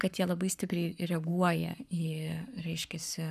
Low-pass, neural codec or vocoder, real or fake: 14.4 kHz; codec, 44.1 kHz, 7.8 kbps, Pupu-Codec; fake